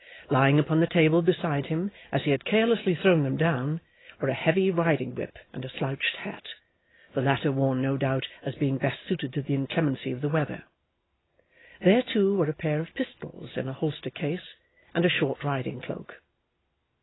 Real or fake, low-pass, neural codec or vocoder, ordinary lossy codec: real; 7.2 kHz; none; AAC, 16 kbps